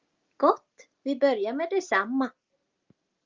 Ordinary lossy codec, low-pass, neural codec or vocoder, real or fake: Opus, 32 kbps; 7.2 kHz; none; real